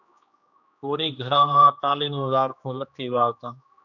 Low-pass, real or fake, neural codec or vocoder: 7.2 kHz; fake; codec, 16 kHz, 2 kbps, X-Codec, HuBERT features, trained on general audio